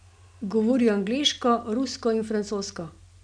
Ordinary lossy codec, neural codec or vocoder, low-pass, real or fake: none; none; 9.9 kHz; real